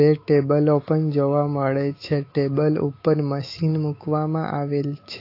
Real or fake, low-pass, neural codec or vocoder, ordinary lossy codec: fake; 5.4 kHz; autoencoder, 48 kHz, 128 numbers a frame, DAC-VAE, trained on Japanese speech; AAC, 32 kbps